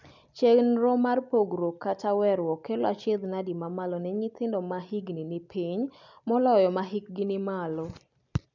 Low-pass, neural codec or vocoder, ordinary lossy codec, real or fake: 7.2 kHz; none; none; real